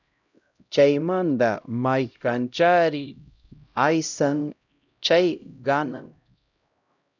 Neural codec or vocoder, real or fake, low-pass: codec, 16 kHz, 0.5 kbps, X-Codec, HuBERT features, trained on LibriSpeech; fake; 7.2 kHz